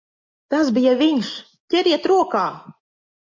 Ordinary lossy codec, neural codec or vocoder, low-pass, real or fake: MP3, 64 kbps; none; 7.2 kHz; real